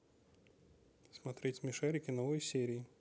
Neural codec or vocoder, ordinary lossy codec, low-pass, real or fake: none; none; none; real